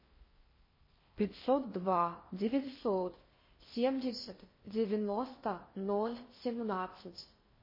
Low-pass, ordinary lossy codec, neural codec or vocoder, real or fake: 5.4 kHz; MP3, 24 kbps; codec, 16 kHz in and 24 kHz out, 0.6 kbps, FocalCodec, streaming, 4096 codes; fake